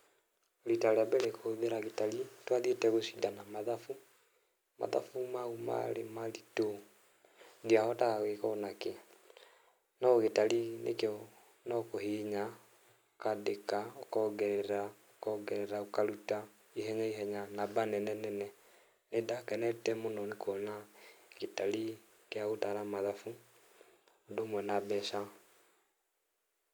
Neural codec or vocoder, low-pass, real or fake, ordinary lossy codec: none; none; real; none